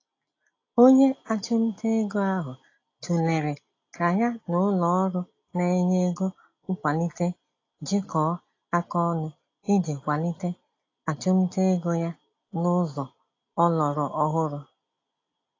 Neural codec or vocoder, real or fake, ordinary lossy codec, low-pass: none; real; AAC, 32 kbps; 7.2 kHz